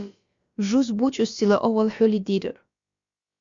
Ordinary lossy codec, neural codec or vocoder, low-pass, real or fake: Opus, 64 kbps; codec, 16 kHz, about 1 kbps, DyCAST, with the encoder's durations; 7.2 kHz; fake